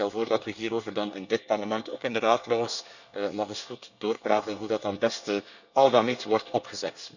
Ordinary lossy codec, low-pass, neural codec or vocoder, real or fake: none; 7.2 kHz; codec, 24 kHz, 1 kbps, SNAC; fake